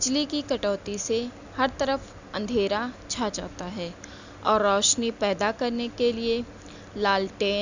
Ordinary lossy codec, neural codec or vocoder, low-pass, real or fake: Opus, 64 kbps; none; 7.2 kHz; real